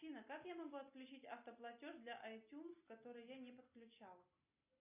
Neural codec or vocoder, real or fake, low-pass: none; real; 3.6 kHz